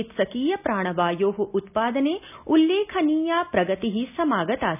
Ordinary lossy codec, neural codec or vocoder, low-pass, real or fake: none; none; 3.6 kHz; real